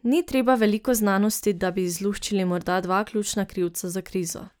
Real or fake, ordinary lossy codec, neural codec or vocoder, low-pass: real; none; none; none